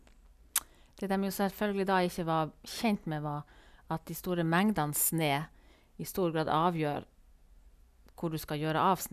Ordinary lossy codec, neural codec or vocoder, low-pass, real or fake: none; none; 14.4 kHz; real